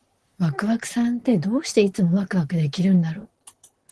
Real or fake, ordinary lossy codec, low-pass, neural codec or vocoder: fake; Opus, 16 kbps; 10.8 kHz; vocoder, 44.1 kHz, 128 mel bands, Pupu-Vocoder